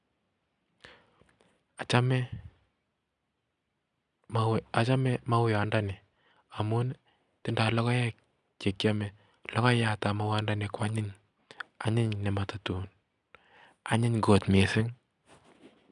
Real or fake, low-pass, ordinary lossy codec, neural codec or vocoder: real; 10.8 kHz; none; none